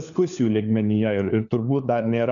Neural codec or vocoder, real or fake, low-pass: codec, 16 kHz, 4 kbps, FunCodec, trained on LibriTTS, 50 frames a second; fake; 7.2 kHz